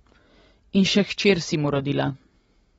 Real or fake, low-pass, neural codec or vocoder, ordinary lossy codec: real; 19.8 kHz; none; AAC, 24 kbps